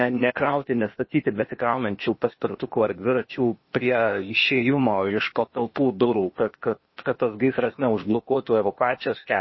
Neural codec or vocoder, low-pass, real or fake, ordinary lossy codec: codec, 16 kHz, 0.8 kbps, ZipCodec; 7.2 kHz; fake; MP3, 24 kbps